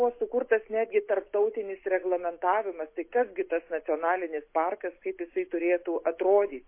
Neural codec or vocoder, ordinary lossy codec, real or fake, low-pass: none; MP3, 24 kbps; real; 3.6 kHz